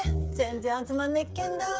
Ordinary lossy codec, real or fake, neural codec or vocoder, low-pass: none; fake; codec, 16 kHz, 8 kbps, FreqCodec, smaller model; none